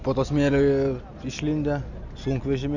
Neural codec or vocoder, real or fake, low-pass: none; real; 7.2 kHz